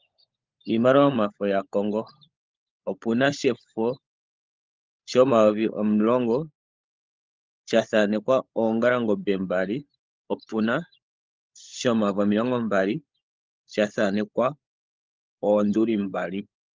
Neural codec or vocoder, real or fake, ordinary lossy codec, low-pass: codec, 16 kHz, 16 kbps, FunCodec, trained on LibriTTS, 50 frames a second; fake; Opus, 32 kbps; 7.2 kHz